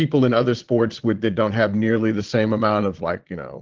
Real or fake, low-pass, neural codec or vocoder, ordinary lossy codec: fake; 7.2 kHz; vocoder, 22.05 kHz, 80 mel bands, Vocos; Opus, 16 kbps